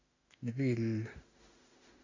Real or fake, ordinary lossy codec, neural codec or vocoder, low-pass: fake; AAC, 48 kbps; autoencoder, 48 kHz, 32 numbers a frame, DAC-VAE, trained on Japanese speech; 7.2 kHz